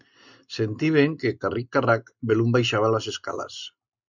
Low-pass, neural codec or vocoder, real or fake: 7.2 kHz; none; real